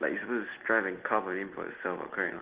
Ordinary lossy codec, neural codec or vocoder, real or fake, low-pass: Opus, 16 kbps; none; real; 3.6 kHz